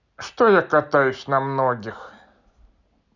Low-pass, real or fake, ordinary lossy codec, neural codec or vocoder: 7.2 kHz; real; none; none